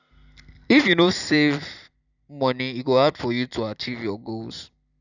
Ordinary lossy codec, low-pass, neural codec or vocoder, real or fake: none; 7.2 kHz; vocoder, 44.1 kHz, 128 mel bands every 256 samples, BigVGAN v2; fake